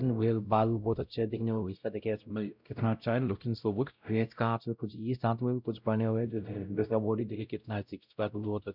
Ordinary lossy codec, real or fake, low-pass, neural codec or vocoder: none; fake; 5.4 kHz; codec, 16 kHz, 0.5 kbps, X-Codec, WavLM features, trained on Multilingual LibriSpeech